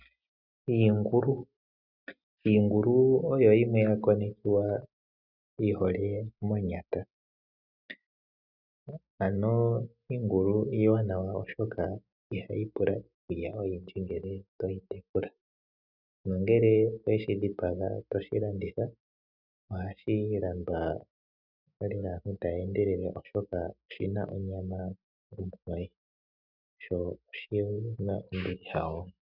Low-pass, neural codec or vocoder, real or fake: 5.4 kHz; none; real